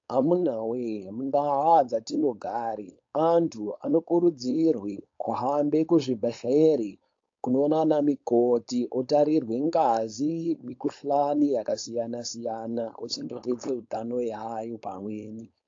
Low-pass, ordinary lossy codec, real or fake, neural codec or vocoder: 7.2 kHz; AAC, 48 kbps; fake; codec, 16 kHz, 4.8 kbps, FACodec